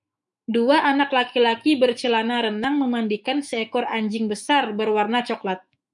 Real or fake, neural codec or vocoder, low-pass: fake; autoencoder, 48 kHz, 128 numbers a frame, DAC-VAE, trained on Japanese speech; 10.8 kHz